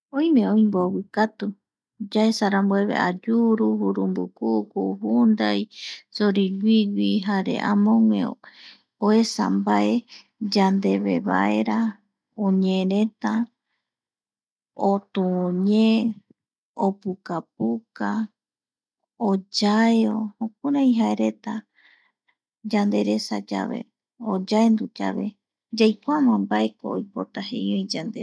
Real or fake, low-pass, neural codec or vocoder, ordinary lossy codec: real; none; none; none